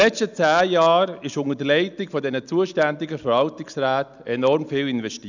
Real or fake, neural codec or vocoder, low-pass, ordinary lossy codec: real; none; 7.2 kHz; none